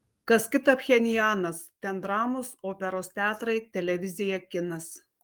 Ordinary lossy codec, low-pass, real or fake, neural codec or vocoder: Opus, 32 kbps; 19.8 kHz; fake; codec, 44.1 kHz, 7.8 kbps, DAC